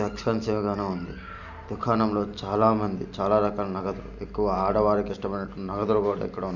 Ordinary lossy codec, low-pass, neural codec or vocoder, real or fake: none; 7.2 kHz; none; real